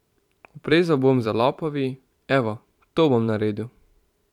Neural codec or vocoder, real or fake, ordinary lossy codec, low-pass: vocoder, 44.1 kHz, 128 mel bands every 512 samples, BigVGAN v2; fake; none; 19.8 kHz